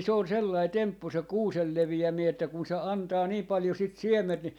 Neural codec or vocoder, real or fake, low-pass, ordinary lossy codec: none; real; 19.8 kHz; none